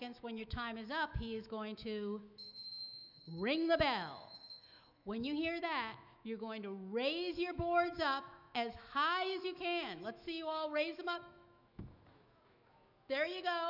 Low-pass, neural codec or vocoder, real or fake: 5.4 kHz; autoencoder, 48 kHz, 128 numbers a frame, DAC-VAE, trained on Japanese speech; fake